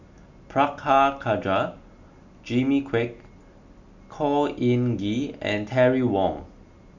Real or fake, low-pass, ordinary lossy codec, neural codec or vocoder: real; 7.2 kHz; none; none